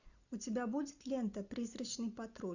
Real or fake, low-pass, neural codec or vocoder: real; 7.2 kHz; none